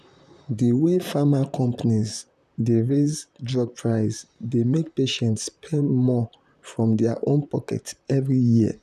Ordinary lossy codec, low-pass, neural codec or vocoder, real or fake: none; 14.4 kHz; vocoder, 44.1 kHz, 128 mel bands, Pupu-Vocoder; fake